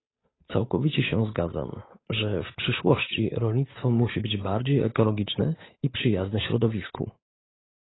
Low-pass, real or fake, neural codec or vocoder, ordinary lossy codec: 7.2 kHz; fake; codec, 16 kHz, 8 kbps, FunCodec, trained on Chinese and English, 25 frames a second; AAC, 16 kbps